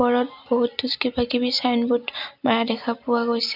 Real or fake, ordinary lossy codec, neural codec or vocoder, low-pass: real; none; none; 5.4 kHz